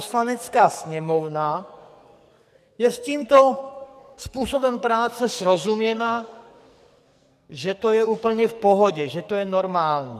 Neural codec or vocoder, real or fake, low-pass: codec, 44.1 kHz, 2.6 kbps, SNAC; fake; 14.4 kHz